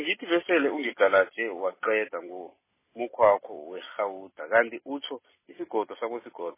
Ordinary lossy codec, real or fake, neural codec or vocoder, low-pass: MP3, 16 kbps; real; none; 3.6 kHz